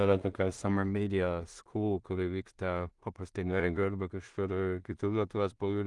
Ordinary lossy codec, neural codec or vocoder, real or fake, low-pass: Opus, 24 kbps; codec, 16 kHz in and 24 kHz out, 0.4 kbps, LongCat-Audio-Codec, two codebook decoder; fake; 10.8 kHz